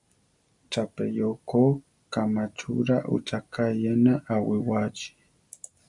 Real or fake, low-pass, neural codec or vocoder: real; 10.8 kHz; none